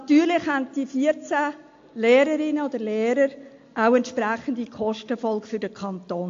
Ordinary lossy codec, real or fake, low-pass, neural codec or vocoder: AAC, 48 kbps; real; 7.2 kHz; none